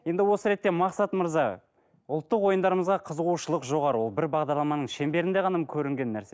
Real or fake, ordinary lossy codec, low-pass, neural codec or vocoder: real; none; none; none